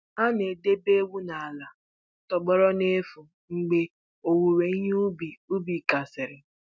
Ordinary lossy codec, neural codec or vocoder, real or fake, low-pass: none; none; real; none